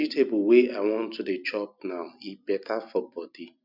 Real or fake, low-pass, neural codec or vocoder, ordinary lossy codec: real; 5.4 kHz; none; none